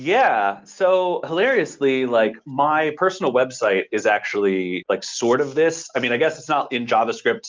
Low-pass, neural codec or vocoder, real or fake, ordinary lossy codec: 7.2 kHz; none; real; Opus, 24 kbps